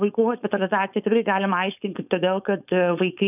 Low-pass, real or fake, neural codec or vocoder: 3.6 kHz; fake; codec, 16 kHz, 4.8 kbps, FACodec